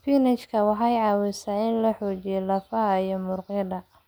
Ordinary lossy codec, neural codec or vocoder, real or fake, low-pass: none; none; real; none